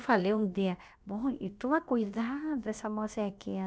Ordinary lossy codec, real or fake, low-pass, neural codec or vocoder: none; fake; none; codec, 16 kHz, about 1 kbps, DyCAST, with the encoder's durations